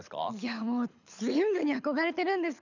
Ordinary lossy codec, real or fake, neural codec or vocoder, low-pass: none; fake; codec, 24 kHz, 6 kbps, HILCodec; 7.2 kHz